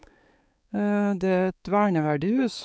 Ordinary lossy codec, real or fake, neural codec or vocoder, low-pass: none; fake; codec, 16 kHz, 4 kbps, X-Codec, HuBERT features, trained on balanced general audio; none